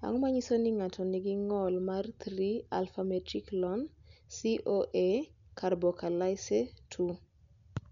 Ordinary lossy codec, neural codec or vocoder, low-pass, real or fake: none; none; 7.2 kHz; real